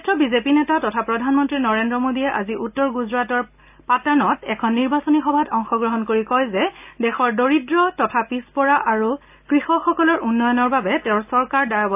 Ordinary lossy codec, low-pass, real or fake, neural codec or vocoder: AAC, 32 kbps; 3.6 kHz; real; none